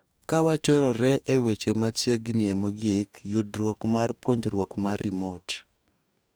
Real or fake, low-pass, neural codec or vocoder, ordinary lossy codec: fake; none; codec, 44.1 kHz, 2.6 kbps, DAC; none